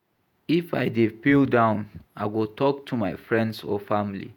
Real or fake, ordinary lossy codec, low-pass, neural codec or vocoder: fake; none; 19.8 kHz; vocoder, 48 kHz, 128 mel bands, Vocos